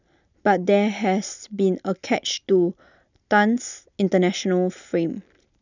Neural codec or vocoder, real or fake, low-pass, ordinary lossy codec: none; real; 7.2 kHz; none